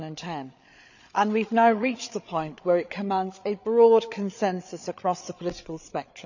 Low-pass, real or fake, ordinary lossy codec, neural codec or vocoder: 7.2 kHz; fake; none; codec, 16 kHz, 8 kbps, FreqCodec, larger model